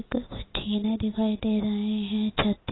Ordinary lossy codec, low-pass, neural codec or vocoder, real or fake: AAC, 16 kbps; 7.2 kHz; none; real